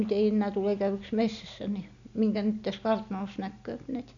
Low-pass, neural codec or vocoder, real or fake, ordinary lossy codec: 7.2 kHz; none; real; none